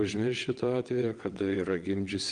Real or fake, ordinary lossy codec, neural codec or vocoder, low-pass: fake; Opus, 24 kbps; vocoder, 22.05 kHz, 80 mel bands, WaveNeXt; 9.9 kHz